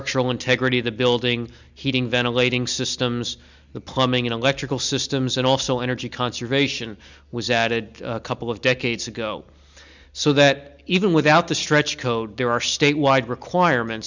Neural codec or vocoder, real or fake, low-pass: none; real; 7.2 kHz